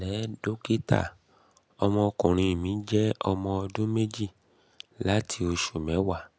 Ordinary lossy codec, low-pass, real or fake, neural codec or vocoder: none; none; real; none